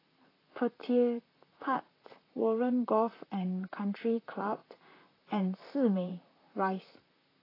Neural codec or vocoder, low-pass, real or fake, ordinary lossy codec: vocoder, 44.1 kHz, 128 mel bands, Pupu-Vocoder; 5.4 kHz; fake; AAC, 24 kbps